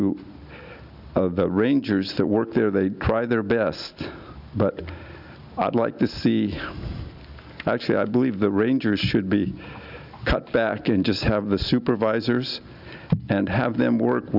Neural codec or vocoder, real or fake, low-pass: none; real; 5.4 kHz